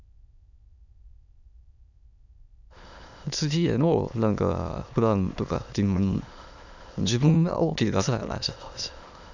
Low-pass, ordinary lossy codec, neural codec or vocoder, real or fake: 7.2 kHz; none; autoencoder, 22.05 kHz, a latent of 192 numbers a frame, VITS, trained on many speakers; fake